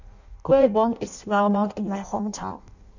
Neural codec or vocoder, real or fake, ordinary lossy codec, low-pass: codec, 16 kHz in and 24 kHz out, 0.6 kbps, FireRedTTS-2 codec; fake; none; 7.2 kHz